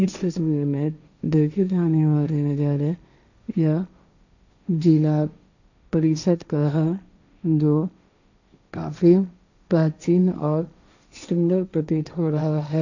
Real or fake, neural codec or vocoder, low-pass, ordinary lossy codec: fake; codec, 16 kHz, 1.1 kbps, Voila-Tokenizer; 7.2 kHz; none